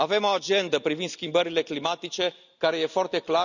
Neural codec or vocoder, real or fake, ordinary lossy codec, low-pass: none; real; none; 7.2 kHz